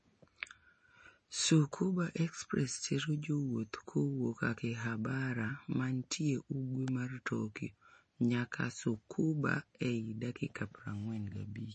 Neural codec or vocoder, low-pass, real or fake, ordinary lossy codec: none; 10.8 kHz; real; MP3, 32 kbps